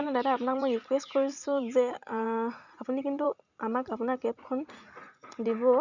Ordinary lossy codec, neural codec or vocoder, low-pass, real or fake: none; vocoder, 44.1 kHz, 80 mel bands, Vocos; 7.2 kHz; fake